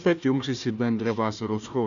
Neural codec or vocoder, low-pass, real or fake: codec, 16 kHz, 1 kbps, FunCodec, trained on Chinese and English, 50 frames a second; 7.2 kHz; fake